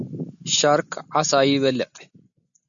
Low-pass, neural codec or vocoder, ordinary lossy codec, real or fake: 7.2 kHz; none; MP3, 96 kbps; real